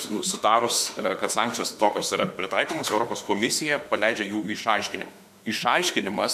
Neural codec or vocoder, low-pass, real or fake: autoencoder, 48 kHz, 32 numbers a frame, DAC-VAE, trained on Japanese speech; 14.4 kHz; fake